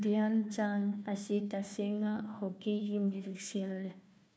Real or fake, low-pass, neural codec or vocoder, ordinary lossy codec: fake; none; codec, 16 kHz, 1 kbps, FunCodec, trained on Chinese and English, 50 frames a second; none